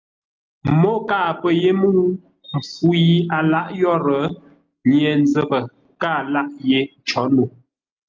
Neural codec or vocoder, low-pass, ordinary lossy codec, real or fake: none; 7.2 kHz; Opus, 32 kbps; real